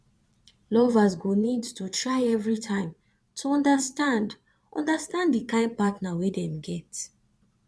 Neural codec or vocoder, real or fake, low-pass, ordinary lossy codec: vocoder, 22.05 kHz, 80 mel bands, Vocos; fake; none; none